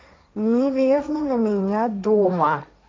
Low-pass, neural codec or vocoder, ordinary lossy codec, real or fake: none; codec, 16 kHz, 1.1 kbps, Voila-Tokenizer; none; fake